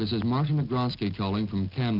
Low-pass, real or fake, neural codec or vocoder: 5.4 kHz; real; none